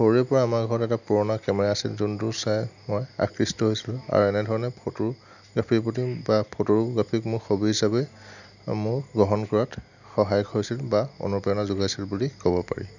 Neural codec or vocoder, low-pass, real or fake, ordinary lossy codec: none; 7.2 kHz; real; none